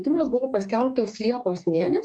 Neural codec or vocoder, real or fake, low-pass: codec, 44.1 kHz, 2.6 kbps, DAC; fake; 9.9 kHz